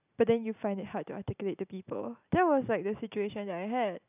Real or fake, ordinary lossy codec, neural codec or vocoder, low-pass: real; none; none; 3.6 kHz